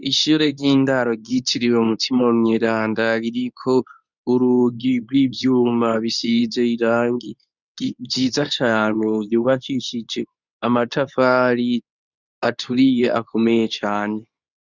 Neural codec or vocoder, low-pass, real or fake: codec, 24 kHz, 0.9 kbps, WavTokenizer, medium speech release version 2; 7.2 kHz; fake